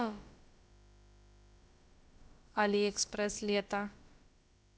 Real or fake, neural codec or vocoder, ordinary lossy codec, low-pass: fake; codec, 16 kHz, about 1 kbps, DyCAST, with the encoder's durations; none; none